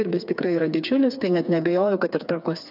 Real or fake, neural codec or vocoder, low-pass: fake; codec, 16 kHz, 4 kbps, FreqCodec, smaller model; 5.4 kHz